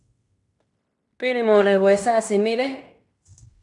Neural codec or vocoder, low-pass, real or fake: codec, 16 kHz in and 24 kHz out, 0.9 kbps, LongCat-Audio-Codec, fine tuned four codebook decoder; 10.8 kHz; fake